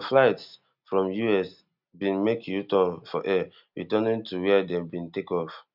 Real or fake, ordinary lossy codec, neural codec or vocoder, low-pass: real; none; none; 5.4 kHz